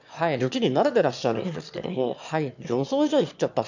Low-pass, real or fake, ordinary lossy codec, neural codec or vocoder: 7.2 kHz; fake; none; autoencoder, 22.05 kHz, a latent of 192 numbers a frame, VITS, trained on one speaker